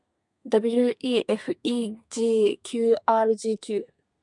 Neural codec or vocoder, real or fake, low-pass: codec, 32 kHz, 1.9 kbps, SNAC; fake; 10.8 kHz